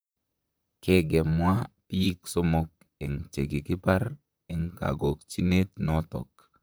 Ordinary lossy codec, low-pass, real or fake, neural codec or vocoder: none; none; fake; vocoder, 44.1 kHz, 128 mel bands, Pupu-Vocoder